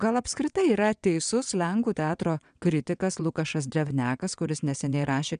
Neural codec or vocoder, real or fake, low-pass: vocoder, 22.05 kHz, 80 mel bands, WaveNeXt; fake; 9.9 kHz